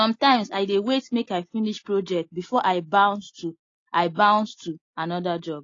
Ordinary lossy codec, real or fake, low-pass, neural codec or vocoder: AAC, 32 kbps; real; 7.2 kHz; none